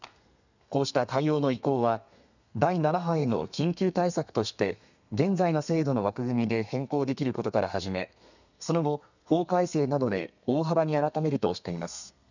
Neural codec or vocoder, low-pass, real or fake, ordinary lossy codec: codec, 32 kHz, 1.9 kbps, SNAC; 7.2 kHz; fake; none